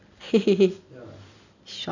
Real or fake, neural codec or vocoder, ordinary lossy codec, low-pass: real; none; none; 7.2 kHz